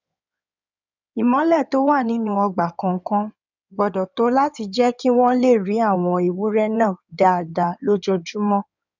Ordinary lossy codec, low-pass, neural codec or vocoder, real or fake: none; 7.2 kHz; codec, 16 kHz in and 24 kHz out, 2.2 kbps, FireRedTTS-2 codec; fake